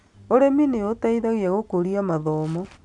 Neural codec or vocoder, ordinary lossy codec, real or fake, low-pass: none; none; real; 10.8 kHz